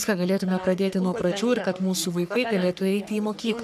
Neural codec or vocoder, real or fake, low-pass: codec, 44.1 kHz, 3.4 kbps, Pupu-Codec; fake; 14.4 kHz